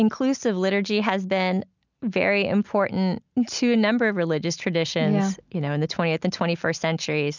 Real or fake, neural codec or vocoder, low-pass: real; none; 7.2 kHz